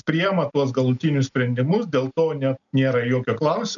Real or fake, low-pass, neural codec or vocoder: real; 7.2 kHz; none